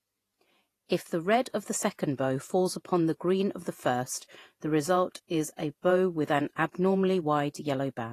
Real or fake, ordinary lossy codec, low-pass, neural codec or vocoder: fake; AAC, 48 kbps; 14.4 kHz; vocoder, 48 kHz, 128 mel bands, Vocos